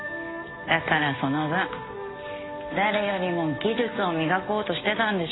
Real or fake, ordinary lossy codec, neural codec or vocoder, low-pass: real; AAC, 16 kbps; none; 7.2 kHz